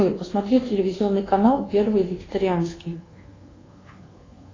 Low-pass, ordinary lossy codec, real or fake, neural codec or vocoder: 7.2 kHz; AAC, 32 kbps; fake; codec, 24 kHz, 1.2 kbps, DualCodec